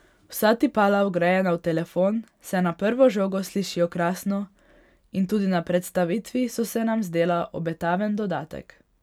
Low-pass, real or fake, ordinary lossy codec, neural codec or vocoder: 19.8 kHz; real; none; none